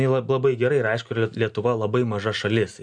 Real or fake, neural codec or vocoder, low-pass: real; none; 9.9 kHz